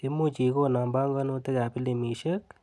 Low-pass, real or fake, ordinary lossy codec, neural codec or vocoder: none; real; none; none